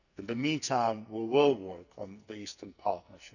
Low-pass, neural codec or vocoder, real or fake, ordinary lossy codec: 7.2 kHz; codec, 44.1 kHz, 2.6 kbps, SNAC; fake; none